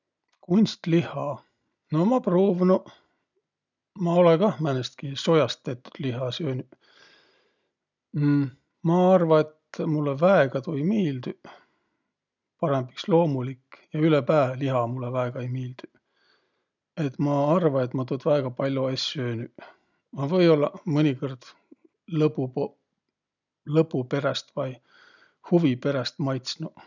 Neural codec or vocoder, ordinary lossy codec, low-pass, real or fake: none; none; 7.2 kHz; real